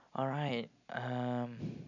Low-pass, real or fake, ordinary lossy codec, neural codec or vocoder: 7.2 kHz; real; none; none